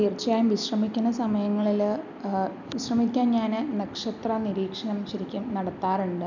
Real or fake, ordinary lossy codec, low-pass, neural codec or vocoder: real; none; 7.2 kHz; none